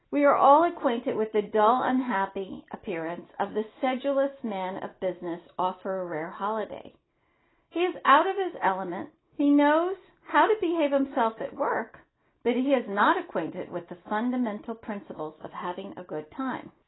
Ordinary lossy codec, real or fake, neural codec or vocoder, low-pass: AAC, 16 kbps; real; none; 7.2 kHz